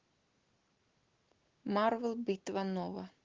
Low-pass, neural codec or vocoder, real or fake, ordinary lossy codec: 7.2 kHz; none; real; Opus, 16 kbps